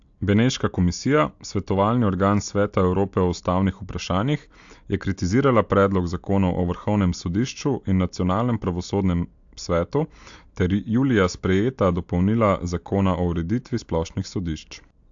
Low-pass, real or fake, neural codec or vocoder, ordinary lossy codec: 7.2 kHz; real; none; AAC, 64 kbps